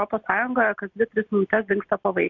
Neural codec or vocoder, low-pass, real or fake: none; 7.2 kHz; real